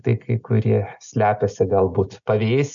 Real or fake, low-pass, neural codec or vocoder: real; 7.2 kHz; none